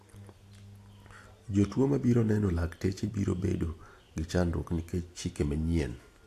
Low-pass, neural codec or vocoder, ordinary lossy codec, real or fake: 14.4 kHz; none; AAC, 64 kbps; real